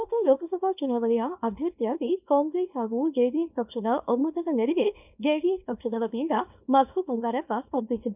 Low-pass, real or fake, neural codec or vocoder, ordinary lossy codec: 3.6 kHz; fake; codec, 24 kHz, 0.9 kbps, WavTokenizer, small release; none